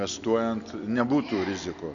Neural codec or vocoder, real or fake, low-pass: none; real; 7.2 kHz